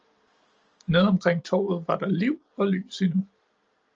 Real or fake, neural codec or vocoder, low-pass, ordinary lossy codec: real; none; 7.2 kHz; Opus, 24 kbps